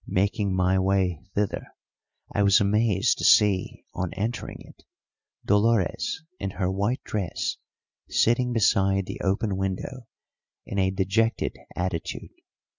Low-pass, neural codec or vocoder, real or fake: 7.2 kHz; none; real